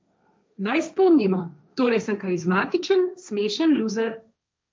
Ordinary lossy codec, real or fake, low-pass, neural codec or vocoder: none; fake; none; codec, 16 kHz, 1.1 kbps, Voila-Tokenizer